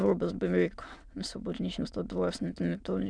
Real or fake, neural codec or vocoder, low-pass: fake; autoencoder, 22.05 kHz, a latent of 192 numbers a frame, VITS, trained on many speakers; 9.9 kHz